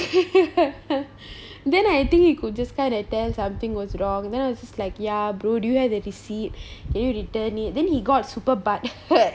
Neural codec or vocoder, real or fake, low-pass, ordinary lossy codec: none; real; none; none